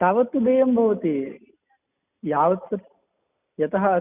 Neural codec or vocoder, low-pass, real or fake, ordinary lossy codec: none; 3.6 kHz; real; none